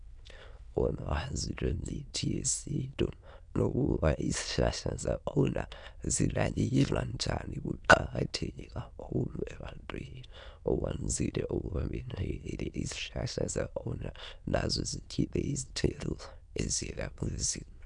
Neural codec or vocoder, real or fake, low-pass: autoencoder, 22.05 kHz, a latent of 192 numbers a frame, VITS, trained on many speakers; fake; 9.9 kHz